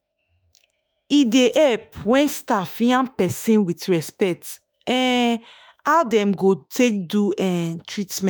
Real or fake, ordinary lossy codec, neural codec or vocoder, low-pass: fake; none; autoencoder, 48 kHz, 32 numbers a frame, DAC-VAE, trained on Japanese speech; none